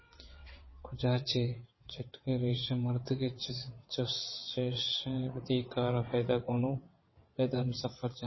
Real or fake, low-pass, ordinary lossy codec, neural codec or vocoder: fake; 7.2 kHz; MP3, 24 kbps; vocoder, 22.05 kHz, 80 mel bands, WaveNeXt